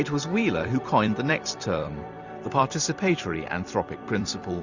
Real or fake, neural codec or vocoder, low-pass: fake; vocoder, 44.1 kHz, 128 mel bands every 256 samples, BigVGAN v2; 7.2 kHz